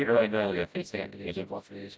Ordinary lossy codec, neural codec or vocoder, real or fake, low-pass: none; codec, 16 kHz, 0.5 kbps, FreqCodec, smaller model; fake; none